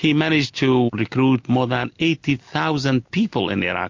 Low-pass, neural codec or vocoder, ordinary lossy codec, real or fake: 7.2 kHz; none; MP3, 48 kbps; real